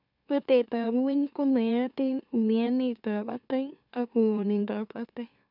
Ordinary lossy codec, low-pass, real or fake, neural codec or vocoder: none; 5.4 kHz; fake; autoencoder, 44.1 kHz, a latent of 192 numbers a frame, MeloTTS